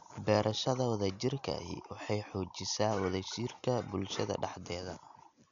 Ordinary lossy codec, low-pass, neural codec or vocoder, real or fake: none; 7.2 kHz; none; real